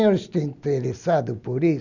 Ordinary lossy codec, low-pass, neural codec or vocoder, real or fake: none; 7.2 kHz; none; real